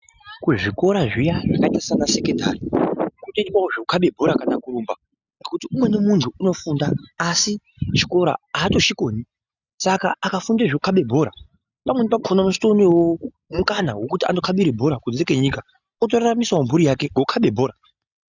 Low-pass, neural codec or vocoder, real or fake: 7.2 kHz; none; real